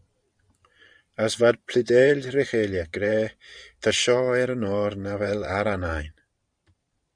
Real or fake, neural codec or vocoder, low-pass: fake; vocoder, 44.1 kHz, 128 mel bands every 512 samples, BigVGAN v2; 9.9 kHz